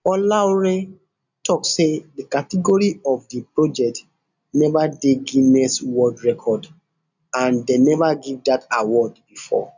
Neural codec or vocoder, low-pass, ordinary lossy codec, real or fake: none; 7.2 kHz; none; real